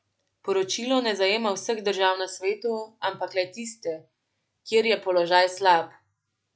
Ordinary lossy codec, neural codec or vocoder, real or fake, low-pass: none; none; real; none